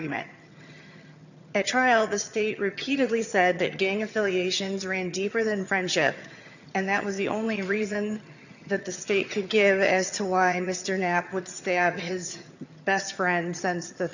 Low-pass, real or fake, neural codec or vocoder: 7.2 kHz; fake; vocoder, 22.05 kHz, 80 mel bands, HiFi-GAN